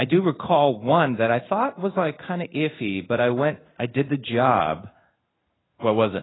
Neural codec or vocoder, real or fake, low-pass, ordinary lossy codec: codec, 16 kHz in and 24 kHz out, 1 kbps, XY-Tokenizer; fake; 7.2 kHz; AAC, 16 kbps